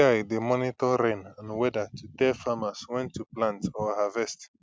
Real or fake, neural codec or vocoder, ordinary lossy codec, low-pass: real; none; none; none